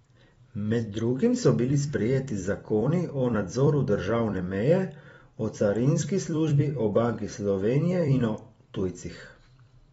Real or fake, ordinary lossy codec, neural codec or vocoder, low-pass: fake; AAC, 24 kbps; vocoder, 44.1 kHz, 128 mel bands every 256 samples, BigVGAN v2; 19.8 kHz